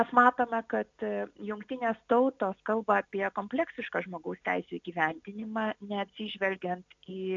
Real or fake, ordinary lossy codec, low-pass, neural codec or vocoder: real; AAC, 64 kbps; 7.2 kHz; none